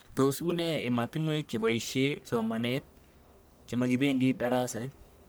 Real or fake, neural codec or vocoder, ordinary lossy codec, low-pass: fake; codec, 44.1 kHz, 1.7 kbps, Pupu-Codec; none; none